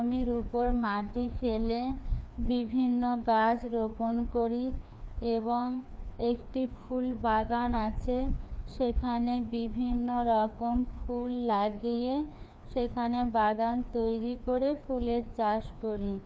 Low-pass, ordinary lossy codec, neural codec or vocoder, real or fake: none; none; codec, 16 kHz, 2 kbps, FreqCodec, larger model; fake